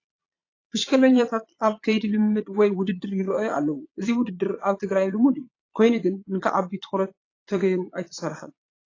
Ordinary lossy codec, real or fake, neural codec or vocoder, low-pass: AAC, 32 kbps; fake; vocoder, 22.05 kHz, 80 mel bands, Vocos; 7.2 kHz